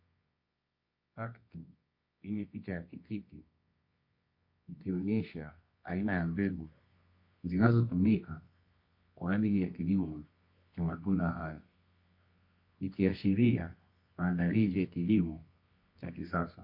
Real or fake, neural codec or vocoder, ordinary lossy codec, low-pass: fake; codec, 24 kHz, 0.9 kbps, WavTokenizer, medium music audio release; MP3, 32 kbps; 5.4 kHz